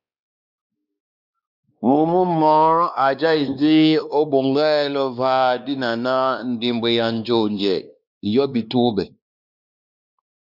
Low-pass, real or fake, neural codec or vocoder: 5.4 kHz; fake; codec, 16 kHz, 2 kbps, X-Codec, WavLM features, trained on Multilingual LibriSpeech